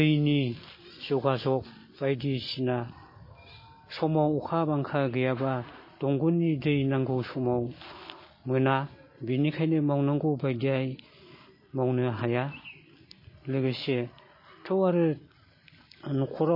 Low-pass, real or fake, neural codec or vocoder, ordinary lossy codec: 5.4 kHz; real; none; MP3, 24 kbps